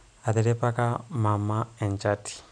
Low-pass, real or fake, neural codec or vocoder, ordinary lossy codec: 9.9 kHz; real; none; none